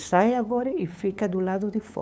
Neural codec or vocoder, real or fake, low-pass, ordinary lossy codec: codec, 16 kHz, 8 kbps, FunCodec, trained on LibriTTS, 25 frames a second; fake; none; none